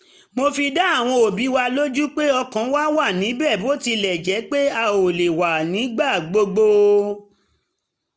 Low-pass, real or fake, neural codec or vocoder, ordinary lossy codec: none; real; none; none